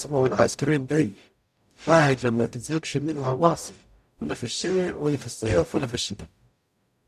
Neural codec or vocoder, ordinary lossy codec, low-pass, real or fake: codec, 44.1 kHz, 0.9 kbps, DAC; none; 14.4 kHz; fake